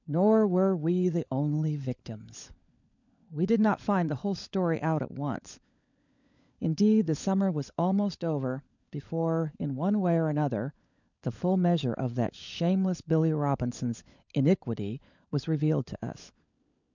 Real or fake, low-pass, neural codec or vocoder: fake; 7.2 kHz; codec, 16 kHz, 16 kbps, FunCodec, trained on LibriTTS, 50 frames a second